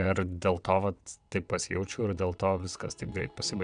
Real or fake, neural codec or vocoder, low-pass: fake; vocoder, 22.05 kHz, 80 mel bands, WaveNeXt; 9.9 kHz